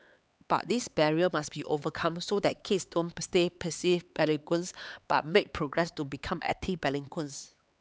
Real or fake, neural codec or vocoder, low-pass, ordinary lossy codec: fake; codec, 16 kHz, 4 kbps, X-Codec, HuBERT features, trained on LibriSpeech; none; none